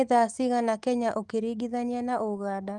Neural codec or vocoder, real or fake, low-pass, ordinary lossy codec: autoencoder, 48 kHz, 128 numbers a frame, DAC-VAE, trained on Japanese speech; fake; 10.8 kHz; Opus, 24 kbps